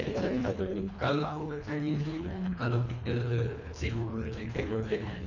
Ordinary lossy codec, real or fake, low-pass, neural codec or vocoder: none; fake; 7.2 kHz; codec, 24 kHz, 1.5 kbps, HILCodec